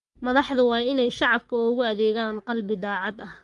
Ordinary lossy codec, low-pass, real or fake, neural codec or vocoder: Opus, 32 kbps; 10.8 kHz; fake; codec, 44.1 kHz, 3.4 kbps, Pupu-Codec